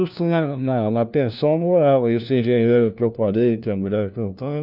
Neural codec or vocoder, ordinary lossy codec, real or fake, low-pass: codec, 16 kHz, 1 kbps, FunCodec, trained on LibriTTS, 50 frames a second; none; fake; 5.4 kHz